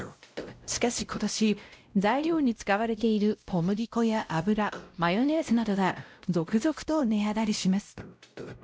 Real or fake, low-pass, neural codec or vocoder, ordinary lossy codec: fake; none; codec, 16 kHz, 0.5 kbps, X-Codec, WavLM features, trained on Multilingual LibriSpeech; none